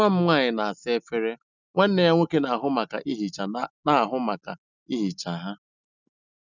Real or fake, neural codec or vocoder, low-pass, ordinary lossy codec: real; none; 7.2 kHz; none